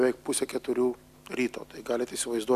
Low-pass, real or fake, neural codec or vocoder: 14.4 kHz; real; none